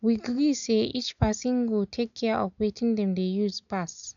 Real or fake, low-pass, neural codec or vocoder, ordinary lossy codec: real; 7.2 kHz; none; none